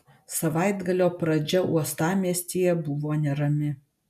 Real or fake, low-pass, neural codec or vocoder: real; 14.4 kHz; none